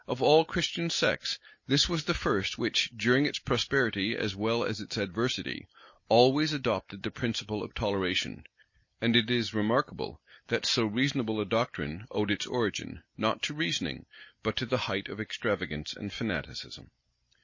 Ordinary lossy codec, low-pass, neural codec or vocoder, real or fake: MP3, 32 kbps; 7.2 kHz; none; real